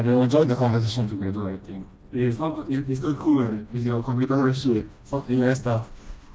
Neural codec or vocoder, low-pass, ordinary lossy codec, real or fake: codec, 16 kHz, 1 kbps, FreqCodec, smaller model; none; none; fake